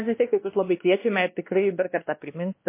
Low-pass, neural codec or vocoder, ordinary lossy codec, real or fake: 3.6 kHz; codec, 16 kHz, 1 kbps, X-Codec, WavLM features, trained on Multilingual LibriSpeech; MP3, 24 kbps; fake